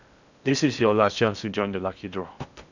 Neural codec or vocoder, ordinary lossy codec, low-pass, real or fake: codec, 16 kHz in and 24 kHz out, 0.8 kbps, FocalCodec, streaming, 65536 codes; none; 7.2 kHz; fake